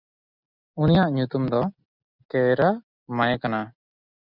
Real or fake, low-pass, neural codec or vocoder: real; 5.4 kHz; none